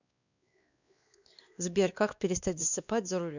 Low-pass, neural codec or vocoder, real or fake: 7.2 kHz; codec, 16 kHz, 2 kbps, X-Codec, WavLM features, trained on Multilingual LibriSpeech; fake